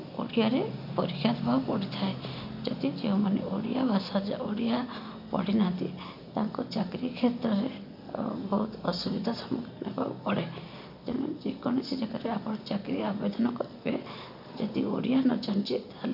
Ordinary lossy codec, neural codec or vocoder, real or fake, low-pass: none; none; real; 5.4 kHz